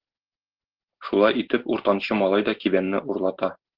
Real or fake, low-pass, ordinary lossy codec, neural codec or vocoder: real; 5.4 kHz; Opus, 16 kbps; none